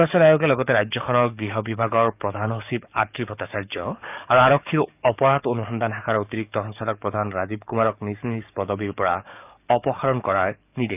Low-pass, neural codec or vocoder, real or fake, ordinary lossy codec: 3.6 kHz; codec, 44.1 kHz, 7.8 kbps, DAC; fake; none